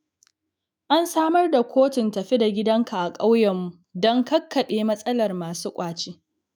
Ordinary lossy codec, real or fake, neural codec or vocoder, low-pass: none; fake; autoencoder, 48 kHz, 128 numbers a frame, DAC-VAE, trained on Japanese speech; none